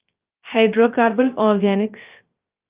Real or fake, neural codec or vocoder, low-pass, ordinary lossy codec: fake; codec, 16 kHz, 0.3 kbps, FocalCodec; 3.6 kHz; Opus, 32 kbps